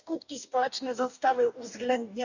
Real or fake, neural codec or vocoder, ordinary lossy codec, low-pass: fake; codec, 44.1 kHz, 2.6 kbps, DAC; none; 7.2 kHz